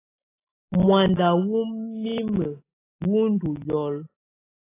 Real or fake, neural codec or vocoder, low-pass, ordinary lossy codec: real; none; 3.6 kHz; AAC, 16 kbps